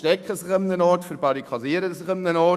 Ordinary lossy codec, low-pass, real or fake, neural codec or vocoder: none; 14.4 kHz; fake; autoencoder, 48 kHz, 128 numbers a frame, DAC-VAE, trained on Japanese speech